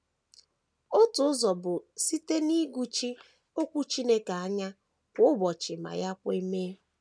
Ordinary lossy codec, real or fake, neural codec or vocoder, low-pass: none; real; none; none